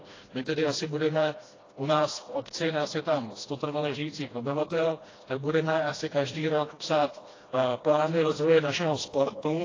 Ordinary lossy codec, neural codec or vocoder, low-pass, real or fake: AAC, 32 kbps; codec, 16 kHz, 1 kbps, FreqCodec, smaller model; 7.2 kHz; fake